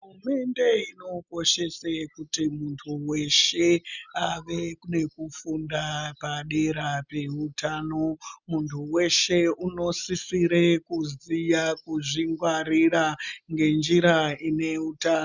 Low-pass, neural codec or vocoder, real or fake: 7.2 kHz; none; real